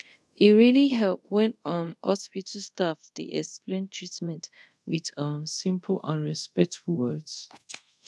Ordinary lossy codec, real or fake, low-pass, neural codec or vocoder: none; fake; none; codec, 24 kHz, 0.5 kbps, DualCodec